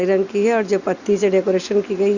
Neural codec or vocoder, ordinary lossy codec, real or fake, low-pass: none; Opus, 64 kbps; real; 7.2 kHz